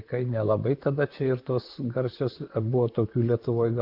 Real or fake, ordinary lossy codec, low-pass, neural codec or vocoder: fake; Opus, 24 kbps; 5.4 kHz; vocoder, 44.1 kHz, 128 mel bands, Pupu-Vocoder